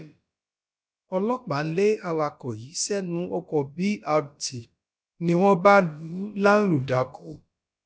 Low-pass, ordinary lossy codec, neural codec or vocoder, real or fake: none; none; codec, 16 kHz, about 1 kbps, DyCAST, with the encoder's durations; fake